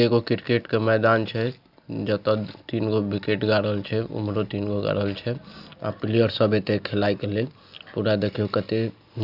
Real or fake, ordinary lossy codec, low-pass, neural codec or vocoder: real; Opus, 64 kbps; 5.4 kHz; none